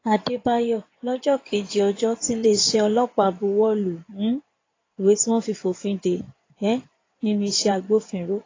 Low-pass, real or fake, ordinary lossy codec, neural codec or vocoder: 7.2 kHz; fake; AAC, 32 kbps; codec, 16 kHz in and 24 kHz out, 2.2 kbps, FireRedTTS-2 codec